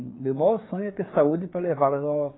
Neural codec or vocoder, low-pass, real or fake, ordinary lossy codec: codec, 16 kHz, 4 kbps, FreqCodec, larger model; 7.2 kHz; fake; AAC, 16 kbps